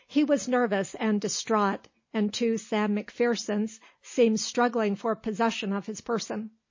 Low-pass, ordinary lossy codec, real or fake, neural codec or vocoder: 7.2 kHz; MP3, 32 kbps; real; none